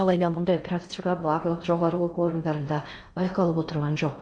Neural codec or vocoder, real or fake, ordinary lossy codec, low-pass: codec, 16 kHz in and 24 kHz out, 0.6 kbps, FocalCodec, streaming, 4096 codes; fake; Opus, 64 kbps; 9.9 kHz